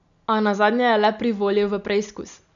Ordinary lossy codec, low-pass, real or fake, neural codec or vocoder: none; 7.2 kHz; real; none